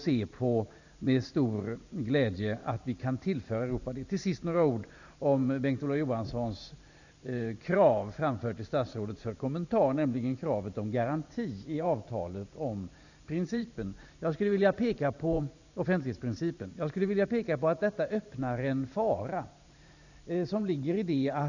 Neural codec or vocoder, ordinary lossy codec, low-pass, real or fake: vocoder, 44.1 kHz, 128 mel bands every 512 samples, BigVGAN v2; none; 7.2 kHz; fake